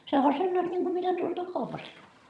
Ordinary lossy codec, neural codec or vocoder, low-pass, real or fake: none; vocoder, 22.05 kHz, 80 mel bands, HiFi-GAN; none; fake